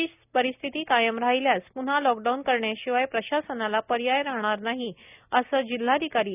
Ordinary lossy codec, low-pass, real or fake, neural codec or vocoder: none; 3.6 kHz; real; none